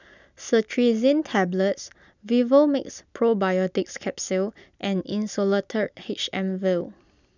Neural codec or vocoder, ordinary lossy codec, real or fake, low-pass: none; none; real; 7.2 kHz